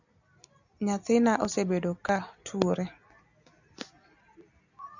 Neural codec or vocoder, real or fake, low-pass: none; real; 7.2 kHz